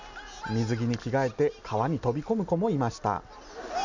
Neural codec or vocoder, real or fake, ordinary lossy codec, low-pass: none; real; none; 7.2 kHz